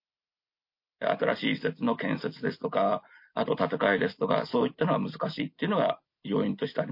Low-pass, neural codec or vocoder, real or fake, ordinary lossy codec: 5.4 kHz; none; real; MP3, 32 kbps